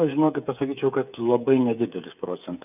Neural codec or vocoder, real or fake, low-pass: codec, 16 kHz, 8 kbps, FreqCodec, smaller model; fake; 3.6 kHz